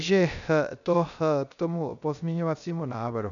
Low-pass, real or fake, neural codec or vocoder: 7.2 kHz; fake; codec, 16 kHz, 0.3 kbps, FocalCodec